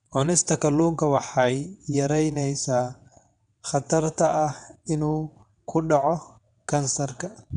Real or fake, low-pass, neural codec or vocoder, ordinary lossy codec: fake; 9.9 kHz; vocoder, 22.05 kHz, 80 mel bands, WaveNeXt; none